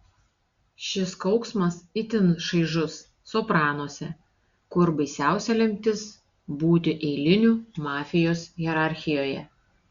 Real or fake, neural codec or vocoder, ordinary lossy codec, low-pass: real; none; Opus, 64 kbps; 7.2 kHz